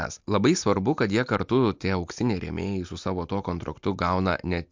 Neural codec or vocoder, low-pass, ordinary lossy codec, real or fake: none; 7.2 kHz; MP3, 64 kbps; real